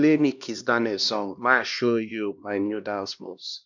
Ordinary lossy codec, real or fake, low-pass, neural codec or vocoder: none; fake; 7.2 kHz; codec, 16 kHz, 1 kbps, X-Codec, HuBERT features, trained on LibriSpeech